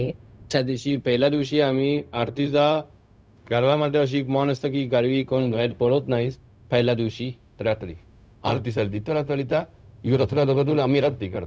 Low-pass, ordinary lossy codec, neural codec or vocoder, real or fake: none; none; codec, 16 kHz, 0.4 kbps, LongCat-Audio-Codec; fake